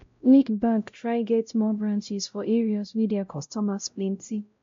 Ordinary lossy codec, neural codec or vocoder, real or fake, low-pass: MP3, 48 kbps; codec, 16 kHz, 0.5 kbps, X-Codec, WavLM features, trained on Multilingual LibriSpeech; fake; 7.2 kHz